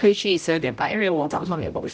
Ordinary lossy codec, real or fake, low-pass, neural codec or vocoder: none; fake; none; codec, 16 kHz, 0.5 kbps, X-Codec, HuBERT features, trained on general audio